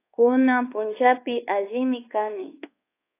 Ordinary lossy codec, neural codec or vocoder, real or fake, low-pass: AAC, 24 kbps; codec, 24 kHz, 1.2 kbps, DualCodec; fake; 3.6 kHz